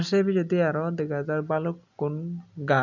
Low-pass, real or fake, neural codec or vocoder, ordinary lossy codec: 7.2 kHz; real; none; none